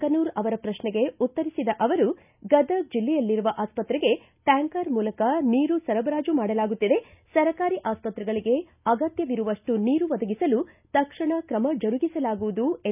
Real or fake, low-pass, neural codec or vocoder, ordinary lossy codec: real; 3.6 kHz; none; none